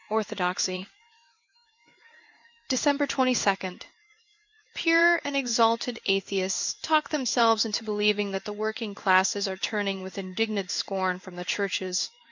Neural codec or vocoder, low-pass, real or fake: codec, 16 kHz in and 24 kHz out, 1 kbps, XY-Tokenizer; 7.2 kHz; fake